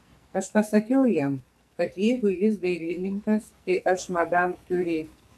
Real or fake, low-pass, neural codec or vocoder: fake; 14.4 kHz; codec, 44.1 kHz, 2.6 kbps, SNAC